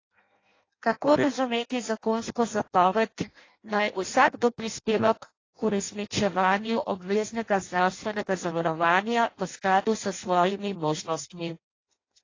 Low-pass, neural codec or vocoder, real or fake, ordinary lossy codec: 7.2 kHz; codec, 16 kHz in and 24 kHz out, 0.6 kbps, FireRedTTS-2 codec; fake; AAC, 32 kbps